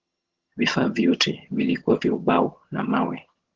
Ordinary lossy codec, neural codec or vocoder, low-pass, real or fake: Opus, 16 kbps; vocoder, 22.05 kHz, 80 mel bands, HiFi-GAN; 7.2 kHz; fake